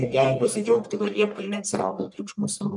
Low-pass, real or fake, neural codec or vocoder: 10.8 kHz; fake; codec, 44.1 kHz, 1.7 kbps, Pupu-Codec